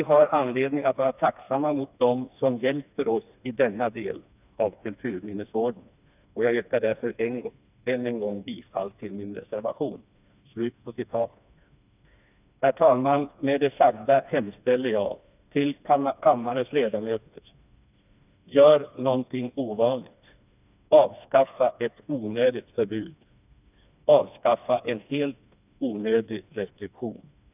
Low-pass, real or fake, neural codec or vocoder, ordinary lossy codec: 3.6 kHz; fake; codec, 16 kHz, 2 kbps, FreqCodec, smaller model; none